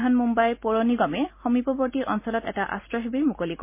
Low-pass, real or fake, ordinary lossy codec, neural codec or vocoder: 3.6 kHz; real; MP3, 24 kbps; none